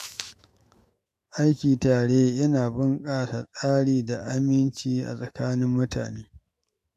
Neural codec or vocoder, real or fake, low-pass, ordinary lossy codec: autoencoder, 48 kHz, 128 numbers a frame, DAC-VAE, trained on Japanese speech; fake; 14.4 kHz; MP3, 64 kbps